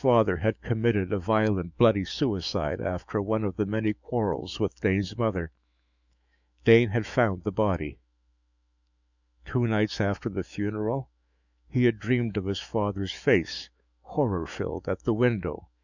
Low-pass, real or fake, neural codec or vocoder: 7.2 kHz; fake; autoencoder, 48 kHz, 128 numbers a frame, DAC-VAE, trained on Japanese speech